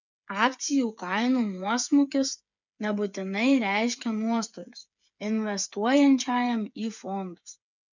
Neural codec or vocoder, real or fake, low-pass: codec, 16 kHz, 8 kbps, FreqCodec, smaller model; fake; 7.2 kHz